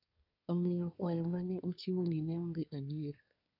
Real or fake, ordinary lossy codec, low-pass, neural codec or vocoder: fake; none; 5.4 kHz; codec, 24 kHz, 1 kbps, SNAC